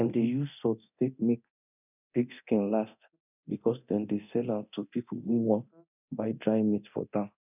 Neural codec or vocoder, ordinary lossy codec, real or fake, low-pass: codec, 24 kHz, 0.9 kbps, DualCodec; none; fake; 3.6 kHz